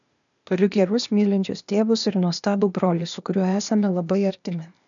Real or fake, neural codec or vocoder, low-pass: fake; codec, 16 kHz, 0.8 kbps, ZipCodec; 7.2 kHz